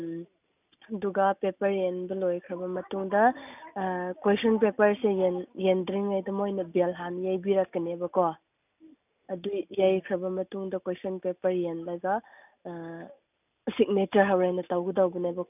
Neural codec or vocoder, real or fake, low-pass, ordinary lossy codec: none; real; 3.6 kHz; none